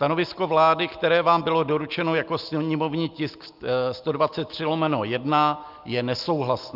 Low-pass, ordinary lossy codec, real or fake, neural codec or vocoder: 5.4 kHz; Opus, 24 kbps; real; none